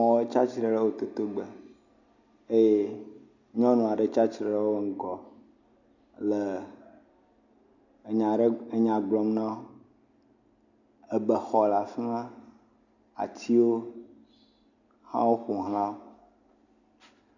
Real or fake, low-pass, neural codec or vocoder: real; 7.2 kHz; none